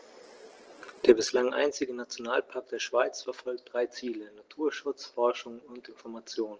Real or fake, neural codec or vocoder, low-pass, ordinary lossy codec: real; none; 7.2 kHz; Opus, 16 kbps